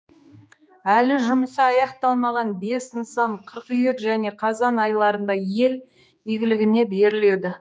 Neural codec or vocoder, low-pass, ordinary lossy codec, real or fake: codec, 16 kHz, 2 kbps, X-Codec, HuBERT features, trained on general audio; none; none; fake